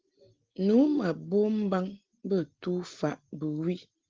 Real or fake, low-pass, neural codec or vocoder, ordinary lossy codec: real; 7.2 kHz; none; Opus, 16 kbps